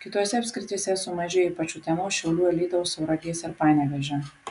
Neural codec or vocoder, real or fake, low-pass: none; real; 10.8 kHz